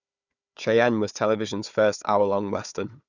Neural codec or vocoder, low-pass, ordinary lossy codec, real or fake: codec, 16 kHz, 4 kbps, FunCodec, trained on Chinese and English, 50 frames a second; 7.2 kHz; none; fake